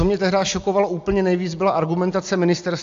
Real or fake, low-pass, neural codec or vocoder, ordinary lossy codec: real; 7.2 kHz; none; AAC, 64 kbps